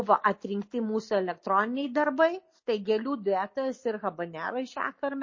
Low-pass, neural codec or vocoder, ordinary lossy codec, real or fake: 7.2 kHz; none; MP3, 32 kbps; real